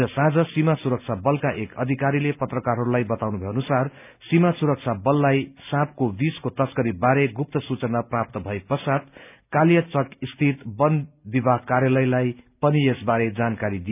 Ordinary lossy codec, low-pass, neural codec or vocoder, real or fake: none; 3.6 kHz; none; real